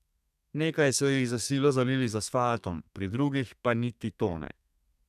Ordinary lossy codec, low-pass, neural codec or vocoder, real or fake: none; 14.4 kHz; codec, 32 kHz, 1.9 kbps, SNAC; fake